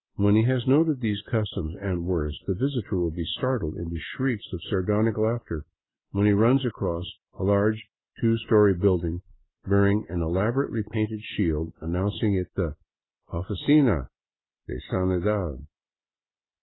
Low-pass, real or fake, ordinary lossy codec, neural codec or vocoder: 7.2 kHz; real; AAC, 16 kbps; none